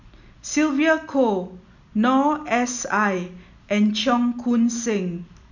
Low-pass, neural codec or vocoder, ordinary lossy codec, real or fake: 7.2 kHz; none; none; real